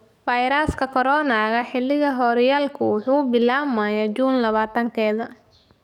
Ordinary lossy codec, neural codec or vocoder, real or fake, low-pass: none; codec, 44.1 kHz, 7.8 kbps, DAC; fake; 19.8 kHz